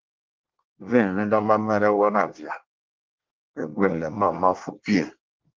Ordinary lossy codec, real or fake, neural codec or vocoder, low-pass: Opus, 32 kbps; fake; codec, 24 kHz, 1 kbps, SNAC; 7.2 kHz